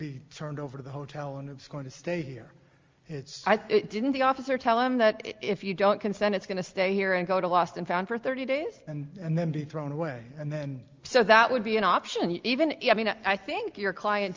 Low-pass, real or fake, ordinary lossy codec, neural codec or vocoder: 7.2 kHz; real; Opus, 32 kbps; none